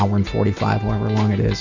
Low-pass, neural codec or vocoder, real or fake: 7.2 kHz; none; real